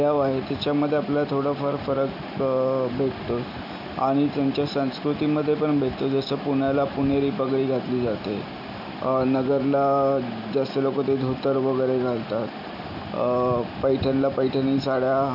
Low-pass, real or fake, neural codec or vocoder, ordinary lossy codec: 5.4 kHz; real; none; none